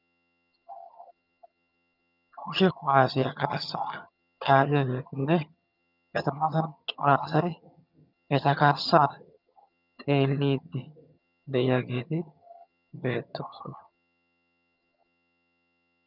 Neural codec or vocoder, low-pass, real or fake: vocoder, 22.05 kHz, 80 mel bands, HiFi-GAN; 5.4 kHz; fake